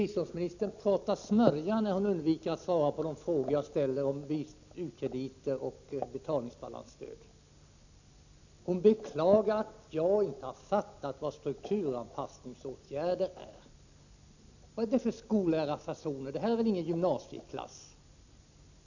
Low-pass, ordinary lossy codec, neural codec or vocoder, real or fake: 7.2 kHz; none; none; real